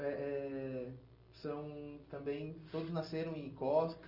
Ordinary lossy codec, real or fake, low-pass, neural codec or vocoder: Opus, 32 kbps; real; 5.4 kHz; none